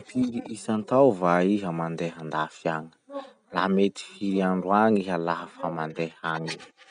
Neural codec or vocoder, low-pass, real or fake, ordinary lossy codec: none; 9.9 kHz; real; none